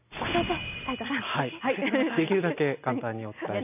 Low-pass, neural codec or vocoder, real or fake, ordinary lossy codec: 3.6 kHz; none; real; none